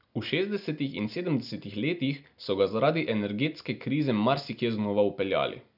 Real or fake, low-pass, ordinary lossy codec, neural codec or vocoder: real; 5.4 kHz; none; none